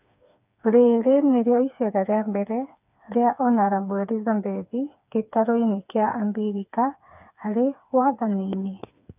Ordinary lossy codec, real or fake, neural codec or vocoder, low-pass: none; fake; codec, 16 kHz, 4 kbps, FreqCodec, smaller model; 3.6 kHz